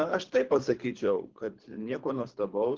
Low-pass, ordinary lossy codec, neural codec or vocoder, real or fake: 7.2 kHz; Opus, 16 kbps; codec, 24 kHz, 3 kbps, HILCodec; fake